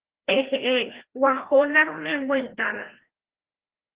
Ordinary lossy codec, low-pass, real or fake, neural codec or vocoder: Opus, 16 kbps; 3.6 kHz; fake; codec, 16 kHz, 1 kbps, FreqCodec, larger model